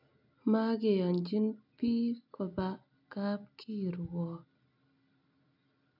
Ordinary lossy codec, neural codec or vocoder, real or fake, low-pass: none; none; real; 5.4 kHz